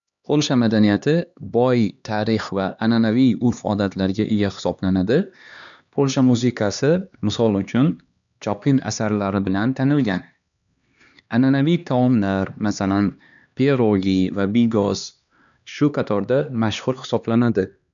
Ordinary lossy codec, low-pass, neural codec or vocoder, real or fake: none; 7.2 kHz; codec, 16 kHz, 2 kbps, X-Codec, HuBERT features, trained on LibriSpeech; fake